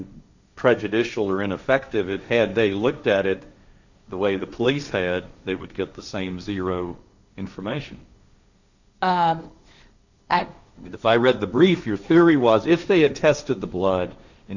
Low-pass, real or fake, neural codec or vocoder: 7.2 kHz; fake; codec, 16 kHz, 1.1 kbps, Voila-Tokenizer